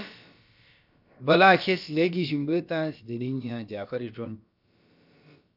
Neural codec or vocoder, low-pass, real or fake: codec, 16 kHz, about 1 kbps, DyCAST, with the encoder's durations; 5.4 kHz; fake